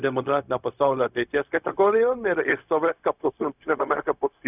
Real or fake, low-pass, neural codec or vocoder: fake; 3.6 kHz; codec, 16 kHz, 0.4 kbps, LongCat-Audio-Codec